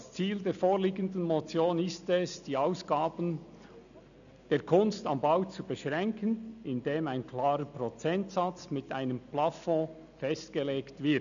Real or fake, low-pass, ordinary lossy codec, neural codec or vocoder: real; 7.2 kHz; none; none